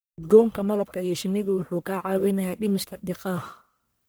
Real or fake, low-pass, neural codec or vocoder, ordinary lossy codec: fake; none; codec, 44.1 kHz, 1.7 kbps, Pupu-Codec; none